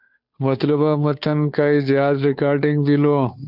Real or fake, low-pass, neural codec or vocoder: fake; 5.4 kHz; codec, 16 kHz, 2 kbps, FunCodec, trained on Chinese and English, 25 frames a second